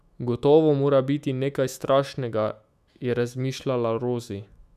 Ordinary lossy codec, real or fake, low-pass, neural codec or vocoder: none; fake; 14.4 kHz; autoencoder, 48 kHz, 128 numbers a frame, DAC-VAE, trained on Japanese speech